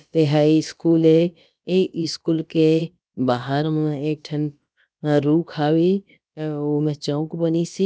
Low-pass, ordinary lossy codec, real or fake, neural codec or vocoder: none; none; fake; codec, 16 kHz, about 1 kbps, DyCAST, with the encoder's durations